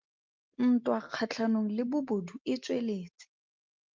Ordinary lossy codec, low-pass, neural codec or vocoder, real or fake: Opus, 24 kbps; 7.2 kHz; none; real